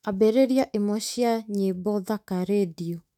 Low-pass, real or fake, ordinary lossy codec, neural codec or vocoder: 19.8 kHz; fake; none; autoencoder, 48 kHz, 128 numbers a frame, DAC-VAE, trained on Japanese speech